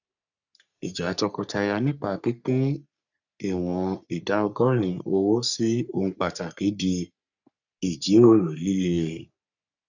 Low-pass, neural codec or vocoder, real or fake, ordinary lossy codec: 7.2 kHz; codec, 44.1 kHz, 3.4 kbps, Pupu-Codec; fake; none